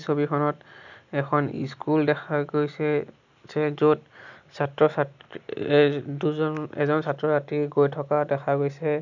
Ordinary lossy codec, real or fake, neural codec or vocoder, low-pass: none; real; none; 7.2 kHz